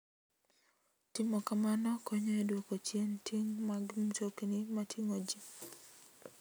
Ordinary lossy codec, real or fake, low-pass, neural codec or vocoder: none; real; none; none